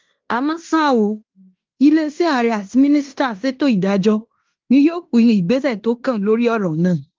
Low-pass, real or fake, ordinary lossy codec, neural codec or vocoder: 7.2 kHz; fake; Opus, 32 kbps; codec, 16 kHz in and 24 kHz out, 0.9 kbps, LongCat-Audio-Codec, fine tuned four codebook decoder